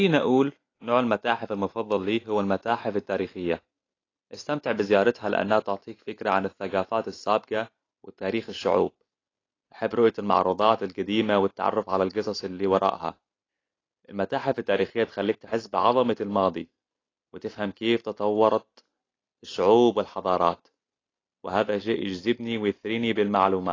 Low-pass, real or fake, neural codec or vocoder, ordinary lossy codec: 7.2 kHz; real; none; AAC, 32 kbps